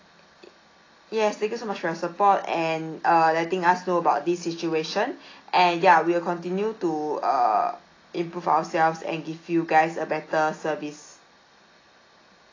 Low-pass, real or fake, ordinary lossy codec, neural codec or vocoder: 7.2 kHz; real; AAC, 32 kbps; none